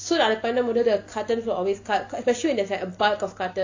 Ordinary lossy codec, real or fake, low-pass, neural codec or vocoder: AAC, 48 kbps; real; 7.2 kHz; none